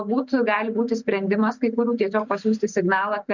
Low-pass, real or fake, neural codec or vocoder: 7.2 kHz; real; none